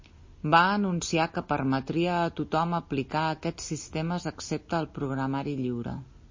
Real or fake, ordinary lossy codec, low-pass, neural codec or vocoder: real; MP3, 32 kbps; 7.2 kHz; none